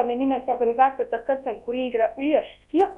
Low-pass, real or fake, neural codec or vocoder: 10.8 kHz; fake; codec, 24 kHz, 0.9 kbps, WavTokenizer, large speech release